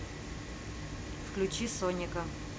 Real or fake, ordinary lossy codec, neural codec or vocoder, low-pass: real; none; none; none